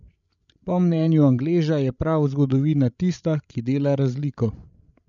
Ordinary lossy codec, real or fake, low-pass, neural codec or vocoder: none; fake; 7.2 kHz; codec, 16 kHz, 16 kbps, FreqCodec, larger model